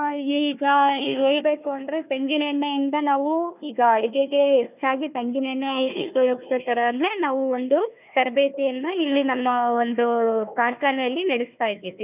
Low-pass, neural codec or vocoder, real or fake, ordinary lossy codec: 3.6 kHz; codec, 16 kHz, 1 kbps, FunCodec, trained on Chinese and English, 50 frames a second; fake; none